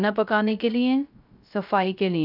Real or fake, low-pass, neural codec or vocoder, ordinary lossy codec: fake; 5.4 kHz; codec, 16 kHz, 0.3 kbps, FocalCodec; none